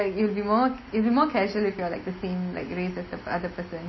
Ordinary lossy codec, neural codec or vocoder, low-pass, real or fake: MP3, 24 kbps; none; 7.2 kHz; real